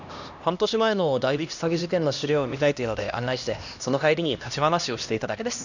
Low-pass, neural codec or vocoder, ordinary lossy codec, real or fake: 7.2 kHz; codec, 16 kHz, 1 kbps, X-Codec, HuBERT features, trained on LibriSpeech; none; fake